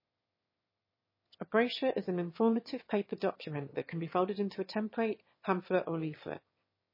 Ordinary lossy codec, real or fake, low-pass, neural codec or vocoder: MP3, 24 kbps; fake; 5.4 kHz; autoencoder, 22.05 kHz, a latent of 192 numbers a frame, VITS, trained on one speaker